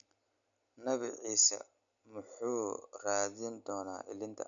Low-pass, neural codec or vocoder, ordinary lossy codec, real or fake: 7.2 kHz; none; none; real